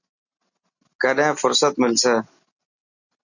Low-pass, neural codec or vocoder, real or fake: 7.2 kHz; none; real